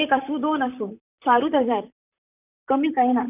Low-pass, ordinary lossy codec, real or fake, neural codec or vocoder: 3.6 kHz; none; fake; vocoder, 44.1 kHz, 128 mel bands, Pupu-Vocoder